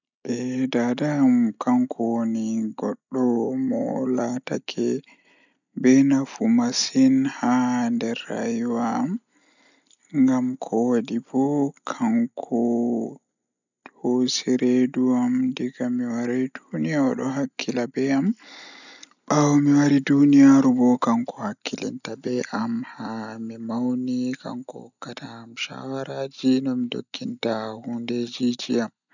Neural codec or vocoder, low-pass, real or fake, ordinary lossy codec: none; 7.2 kHz; real; none